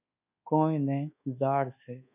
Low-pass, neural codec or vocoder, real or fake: 3.6 kHz; codec, 24 kHz, 1.2 kbps, DualCodec; fake